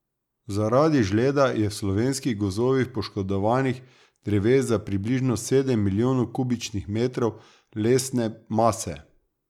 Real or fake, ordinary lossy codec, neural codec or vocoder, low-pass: real; none; none; 19.8 kHz